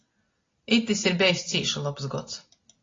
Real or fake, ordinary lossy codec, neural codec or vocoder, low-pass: real; AAC, 32 kbps; none; 7.2 kHz